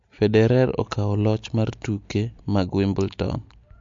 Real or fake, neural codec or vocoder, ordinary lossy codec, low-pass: real; none; MP3, 48 kbps; 7.2 kHz